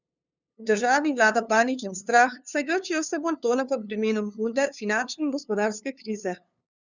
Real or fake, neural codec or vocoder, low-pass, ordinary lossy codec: fake; codec, 16 kHz, 2 kbps, FunCodec, trained on LibriTTS, 25 frames a second; 7.2 kHz; none